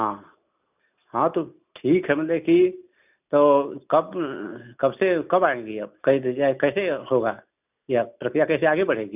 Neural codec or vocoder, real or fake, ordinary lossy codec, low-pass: none; real; none; 3.6 kHz